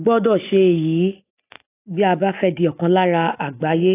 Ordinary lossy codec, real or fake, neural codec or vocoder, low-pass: AAC, 16 kbps; real; none; 3.6 kHz